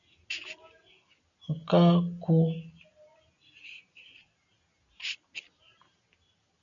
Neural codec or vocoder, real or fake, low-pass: none; real; 7.2 kHz